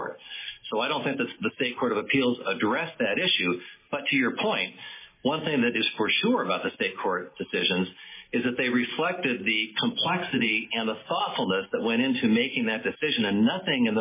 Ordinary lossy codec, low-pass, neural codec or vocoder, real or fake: MP3, 16 kbps; 3.6 kHz; none; real